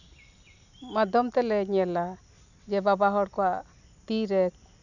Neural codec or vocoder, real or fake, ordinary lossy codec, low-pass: none; real; none; 7.2 kHz